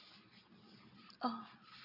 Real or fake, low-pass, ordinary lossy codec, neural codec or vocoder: real; 5.4 kHz; none; none